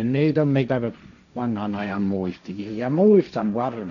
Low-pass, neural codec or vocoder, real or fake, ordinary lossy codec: 7.2 kHz; codec, 16 kHz, 1.1 kbps, Voila-Tokenizer; fake; none